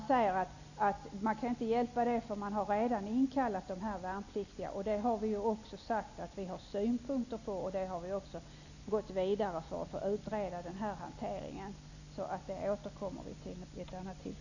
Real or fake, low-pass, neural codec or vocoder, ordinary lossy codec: real; 7.2 kHz; none; none